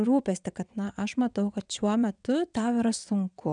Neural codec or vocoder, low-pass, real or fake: vocoder, 22.05 kHz, 80 mel bands, WaveNeXt; 9.9 kHz; fake